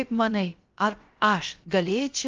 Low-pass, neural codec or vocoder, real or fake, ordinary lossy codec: 7.2 kHz; codec, 16 kHz, about 1 kbps, DyCAST, with the encoder's durations; fake; Opus, 24 kbps